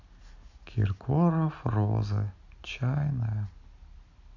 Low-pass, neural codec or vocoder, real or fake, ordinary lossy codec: 7.2 kHz; none; real; none